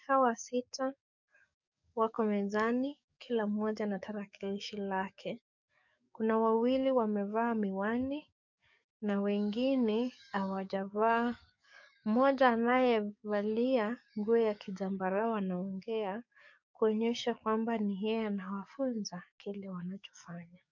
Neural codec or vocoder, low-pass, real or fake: codec, 44.1 kHz, 7.8 kbps, DAC; 7.2 kHz; fake